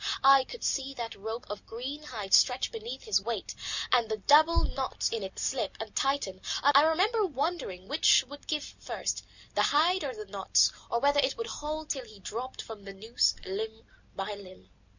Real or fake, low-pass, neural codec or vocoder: real; 7.2 kHz; none